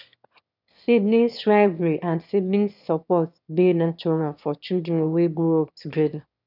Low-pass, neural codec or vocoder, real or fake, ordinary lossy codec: 5.4 kHz; autoencoder, 22.05 kHz, a latent of 192 numbers a frame, VITS, trained on one speaker; fake; none